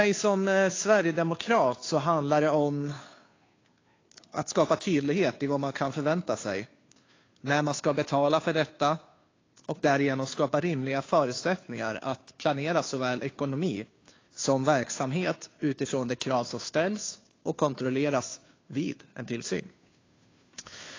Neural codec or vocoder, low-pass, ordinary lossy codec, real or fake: codec, 16 kHz, 2 kbps, FunCodec, trained on Chinese and English, 25 frames a second; 7.2 kHz; AAC, 32 kbps; fake